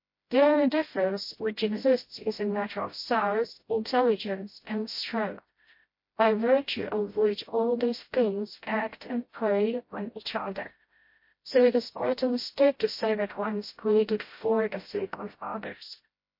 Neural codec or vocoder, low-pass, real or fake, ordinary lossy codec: codec, 16 kHz, 0.5 kbps, FreqCodec, smaller model; 5.4 kHz; fake; MP3, 32 kbps